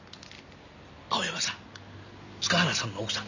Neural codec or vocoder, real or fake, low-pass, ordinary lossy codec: none; real; 7.2 kHz; none